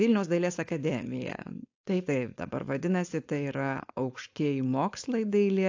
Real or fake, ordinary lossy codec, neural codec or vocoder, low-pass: fake; AAC, 48 kbps; codec, 16 kHz, 4.8 kbps, FACodec; 7.2 kHz